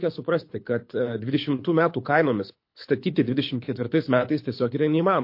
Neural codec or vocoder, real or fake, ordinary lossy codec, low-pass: codec, 24 kHz, 6 kbps, HILCodec; fake; MP3, 32 kbps; 5.4 kHz